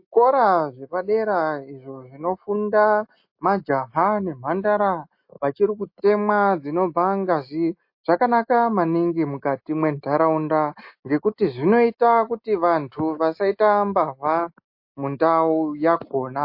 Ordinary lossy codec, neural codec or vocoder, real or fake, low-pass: MP3, 32 kbps; none; real; 5.4 kHz